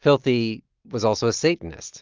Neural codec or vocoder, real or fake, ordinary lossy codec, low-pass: none; real; Opus, 32 kbps; 7.2 kHz